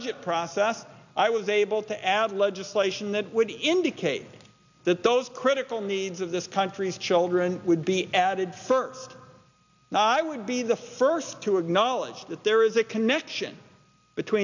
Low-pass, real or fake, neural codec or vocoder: 7.2 kHz; real; none